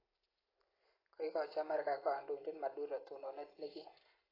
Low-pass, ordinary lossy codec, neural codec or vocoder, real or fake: 5.4 kHz; AAC, 24 kbps; none; real